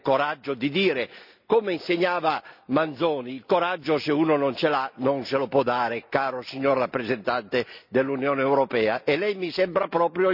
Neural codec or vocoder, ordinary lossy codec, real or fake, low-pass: none; none; real; 5.4 kHz